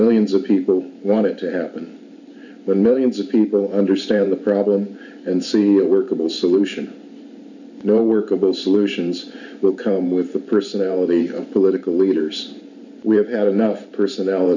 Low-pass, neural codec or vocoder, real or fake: 7.2 kHz; vocoder, 44.1 kHz, 128 mel bands every 512 samples, BigVGAN v2; fake